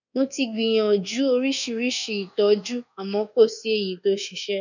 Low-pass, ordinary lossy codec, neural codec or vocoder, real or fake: 7.2 kHz; none; codec, 24 kHz, 1.2 kbps, DualCodec; fake